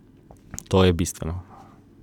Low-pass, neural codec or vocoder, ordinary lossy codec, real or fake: 19.8 kHz; none; none; real